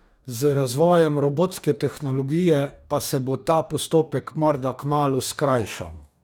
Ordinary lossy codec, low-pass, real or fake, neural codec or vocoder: none; none; fake; codec, 44.1 kHz, 2.6 kbps, DAC